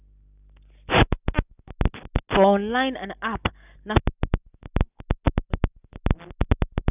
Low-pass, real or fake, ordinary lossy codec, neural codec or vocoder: 3.6 kHz; real; none; none